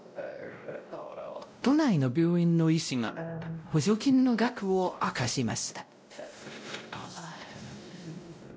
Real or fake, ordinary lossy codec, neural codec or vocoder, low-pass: fake; none; codec, 16 kHz, 0.5 kbps, X-Codec, WavLM features, trained on Multilingual LibriSpeech; none